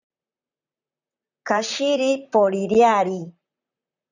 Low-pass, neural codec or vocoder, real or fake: 7.2 kHz; vocoder, 44.1 kHz, 128 mel bands, Pupu-Vocoder; fake